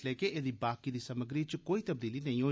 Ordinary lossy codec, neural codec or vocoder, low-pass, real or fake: none; none; none; real